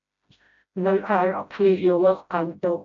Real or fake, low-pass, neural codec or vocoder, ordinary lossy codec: fake; 7.2 kHz; codec, 16 kHz, 0.5 kbps, FreqCodec, smaller model; MP3, 64 kbps